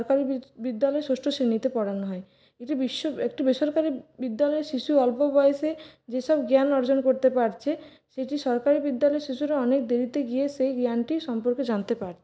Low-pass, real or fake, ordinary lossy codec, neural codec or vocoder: none; real; none; none